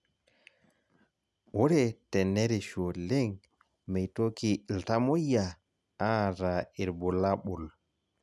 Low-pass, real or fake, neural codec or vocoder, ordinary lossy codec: none; real; none; none